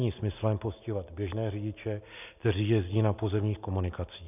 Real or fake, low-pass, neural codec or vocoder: real; 3.6 kHz; none